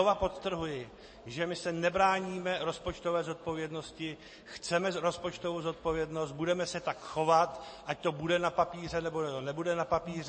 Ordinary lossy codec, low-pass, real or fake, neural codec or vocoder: MP3, 32 kbps; 9.9 kHz; real; none